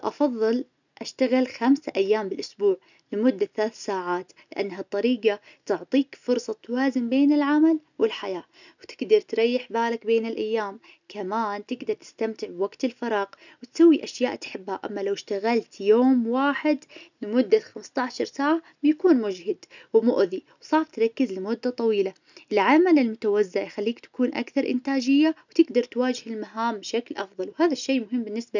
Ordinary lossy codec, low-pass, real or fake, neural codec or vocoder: none; 7.2 kHz; real; none